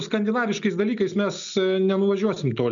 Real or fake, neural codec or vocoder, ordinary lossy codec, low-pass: real; none; MP3, 96 kbps; 7.2 kHz